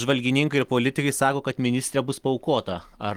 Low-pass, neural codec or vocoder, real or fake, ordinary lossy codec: 19.8 kHz; none; real; Opus, 24 kbps